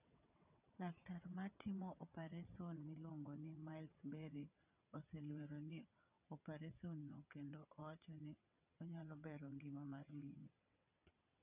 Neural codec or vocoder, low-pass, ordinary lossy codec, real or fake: vocoder, 22.05 kHz, 80 mel bands, WaveNeXt; 3.6 kHz; none; fake